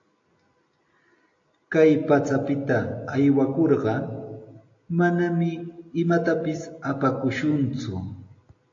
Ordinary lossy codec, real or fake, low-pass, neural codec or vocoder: AAC, 48 kbps; real; 7.2 kHz; none